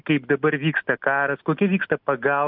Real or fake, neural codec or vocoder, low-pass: real; none; 5.4 kHz